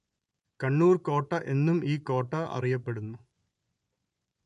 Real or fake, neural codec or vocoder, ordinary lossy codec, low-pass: fake; vocoder, 24 kHz, 100 mel bands, Vocos; none; 10.8 kHz